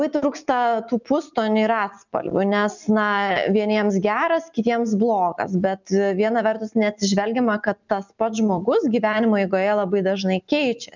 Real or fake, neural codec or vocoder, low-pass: real; none; 7.2 kHz